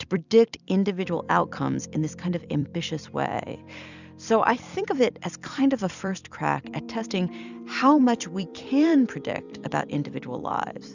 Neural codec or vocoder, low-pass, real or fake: vocoder, 44.1 kHz, 128 mel bands every 256 samples, BigVGAN v2; 7.2 kHz; fake